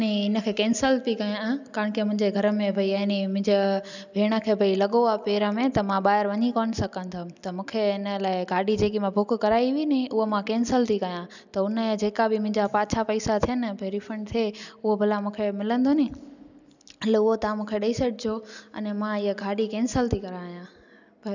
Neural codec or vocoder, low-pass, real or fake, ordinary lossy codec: none; 7.2 kHz; real; none